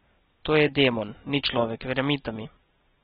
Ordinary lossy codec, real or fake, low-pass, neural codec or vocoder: AAC, 16 kbps; real; 7.2 kHz; none